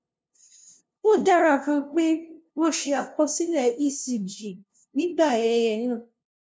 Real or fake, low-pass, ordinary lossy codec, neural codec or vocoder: fake; none; none; codec, 16 kHz, 0.5 kbps, FunCodec, trained on LibriTTS, 25 frames a second